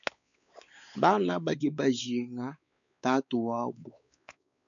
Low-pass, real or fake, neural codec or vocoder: 7.2 kHz; fake; codec, 16 kHz, 4 kbps, X-Codec, WavLM features, trained on Multilingual LibriSpeech